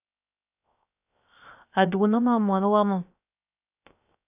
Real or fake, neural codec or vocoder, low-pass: fake; codec, 16 kHz, 0.3 kbps, FocalCodec; 3.6 kHz